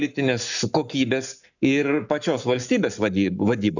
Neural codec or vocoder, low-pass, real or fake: codec, 44.1 kHz, 7.8 kbps, Pupu-Codec; 7.2 kHz; fake